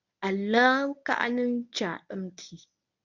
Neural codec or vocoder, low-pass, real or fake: codec, 24 kHz, 0.9 kbps, WavTokenizer, medium speech release version 1; 7.2 kHz; fake